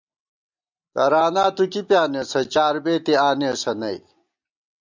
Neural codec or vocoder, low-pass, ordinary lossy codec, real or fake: none; 7.2 kHz; MP3, 64 kbps; real